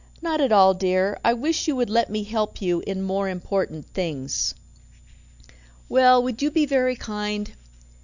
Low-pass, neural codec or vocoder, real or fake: 7.2 kHz; none; real